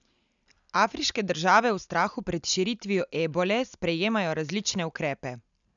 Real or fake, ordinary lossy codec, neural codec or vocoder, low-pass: real; none; none; 7.2 kHz